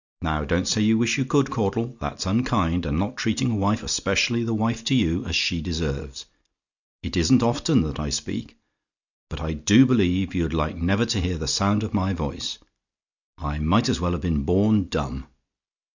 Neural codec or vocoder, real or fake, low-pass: none; real; 7.2 kHz